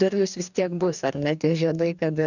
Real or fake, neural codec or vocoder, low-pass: fake; codec, 44.1 kHz, 2.6 kbps, DAC; 7.2 kHz